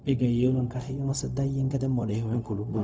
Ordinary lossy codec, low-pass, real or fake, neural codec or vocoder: none; none; fake; codec, 16 kHz, 0.4 kbps, LongCat-Audio-Codec